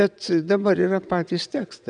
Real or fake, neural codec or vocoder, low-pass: fake; vocoder, 22.05 kHz, 80 mel bands, WaveNeXt; 9.9 kHz